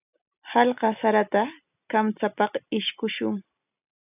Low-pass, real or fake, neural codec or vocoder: 3.6 kHz; real; none